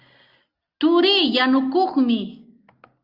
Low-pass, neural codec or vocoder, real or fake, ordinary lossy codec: 5.4 kHz; none; real; Opus, 24 kbps